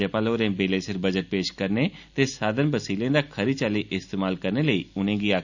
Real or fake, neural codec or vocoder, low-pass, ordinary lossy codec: real; none; 7.2 kHz; none